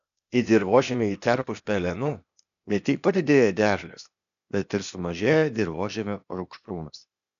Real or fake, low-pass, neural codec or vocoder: fake; 7.2 kHz; codec, 16 kHz, 0.8 kbps, ZipCodec